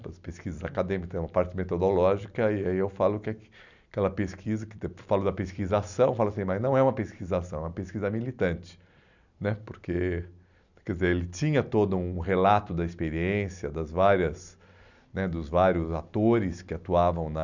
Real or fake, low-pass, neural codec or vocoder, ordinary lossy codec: real; 7.2 kHz; none; none